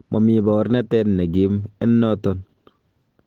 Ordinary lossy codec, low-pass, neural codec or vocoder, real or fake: Opus, 16 kbps; 19.8 kHz; none; real